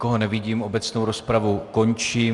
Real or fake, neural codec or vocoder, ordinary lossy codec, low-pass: real; none; Opus, 64 kbps; 10.8 kHz